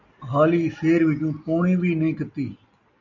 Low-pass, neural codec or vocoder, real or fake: 7.2 kHz; none; real